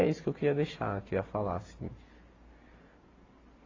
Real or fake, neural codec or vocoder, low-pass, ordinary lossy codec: real; none; 7.2 kHz; AAC, 32 kbps